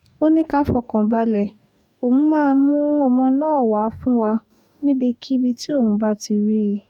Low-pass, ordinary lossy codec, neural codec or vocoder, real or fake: 19.8 kHz; none; codec, 44.1 kHz, 2.6 kbps, DAC; fake